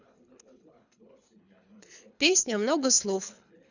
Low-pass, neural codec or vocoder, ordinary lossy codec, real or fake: 7.2 kHz; codec, 24 kHz, 3 kbps, HILCodec; none; fake